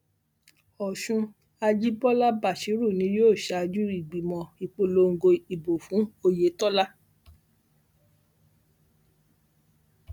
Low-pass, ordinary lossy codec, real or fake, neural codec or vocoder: 19.8 kHz; none; real; none